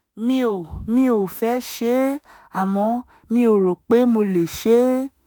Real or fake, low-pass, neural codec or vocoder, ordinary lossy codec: fake; none; autoencoder, 48 kHz, 32 numbers a frame, DAC-VAE, trained on Japanese speech; none